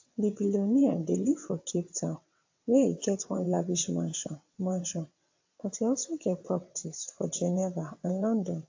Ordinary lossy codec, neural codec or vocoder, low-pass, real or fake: AAC, 48 kbps; vocoder, 22.05 kHz, 80 mel bands, WaveNeXt; 7.2 kHz; fake